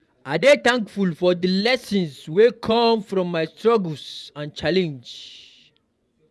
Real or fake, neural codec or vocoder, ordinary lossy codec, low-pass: fake; vocoder, 24 kHz, 100 mel bands, Vocos; none; none